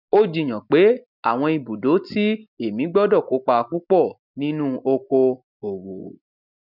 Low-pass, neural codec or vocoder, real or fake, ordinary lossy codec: 5.4 kHz; none; real; none